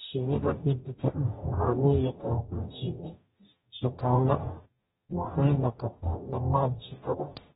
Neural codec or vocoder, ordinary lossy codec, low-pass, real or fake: codec, 44.1 kHz, 0.9 kbps, DAC; AAC, 16 kbps; 19.8 kHz; fake